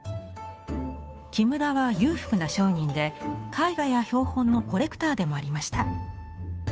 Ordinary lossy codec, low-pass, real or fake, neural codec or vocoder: none; none; fake; codec, 16 kHz, 2 kbps, FunCodec, trained on Chinese and English, 25 frames a second